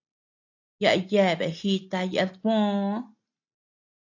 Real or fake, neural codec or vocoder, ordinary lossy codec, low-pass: real; none; AAC, 48 kbps; 7.2 kHz